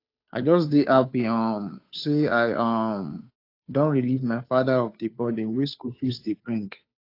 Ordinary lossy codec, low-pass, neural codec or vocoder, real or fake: AAC, 32 kbps; 5.4 kHz; codec, 16 kHz, 2 kbps, FunCodec, trained on Chinese and English, 25 frames a second; fake